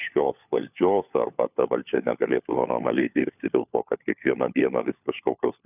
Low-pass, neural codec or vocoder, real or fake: 3.6 kHz; codec, 16 kHz, 4 kbps, FunCodec, trained on LibriTTS, 50 frames a second; fake